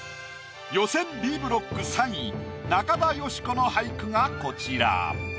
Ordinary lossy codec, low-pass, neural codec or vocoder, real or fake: none; none; none; real